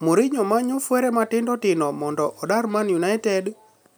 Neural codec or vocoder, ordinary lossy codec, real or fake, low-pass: none; none; real; none